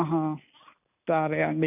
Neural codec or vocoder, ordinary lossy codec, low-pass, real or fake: none; none; 3.6 kHz; real